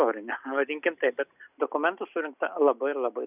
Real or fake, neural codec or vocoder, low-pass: real; none; 3.6 kHz